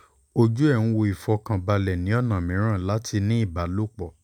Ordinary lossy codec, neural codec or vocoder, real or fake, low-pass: none; none; real; 19.8 kHz